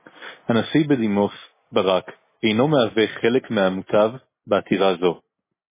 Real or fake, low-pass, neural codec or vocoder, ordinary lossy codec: real; 3.6 kHz; none; MP3, 16 kbps